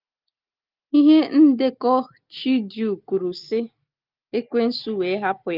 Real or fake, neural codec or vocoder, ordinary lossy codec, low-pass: real; none; Opus, 32 kbps; 5.4 kHz